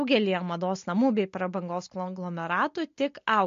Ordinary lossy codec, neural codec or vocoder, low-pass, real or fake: MP3, 48 kbps; none; 7.2 kHz; real